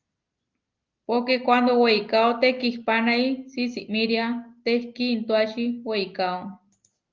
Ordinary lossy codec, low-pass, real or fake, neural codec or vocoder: Opus, 24 kbps; 7.2 kHz; real; none